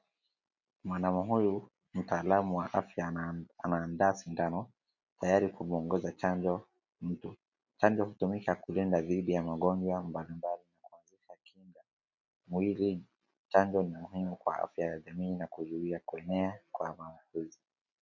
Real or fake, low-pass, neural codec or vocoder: real; 7.2 kHz; none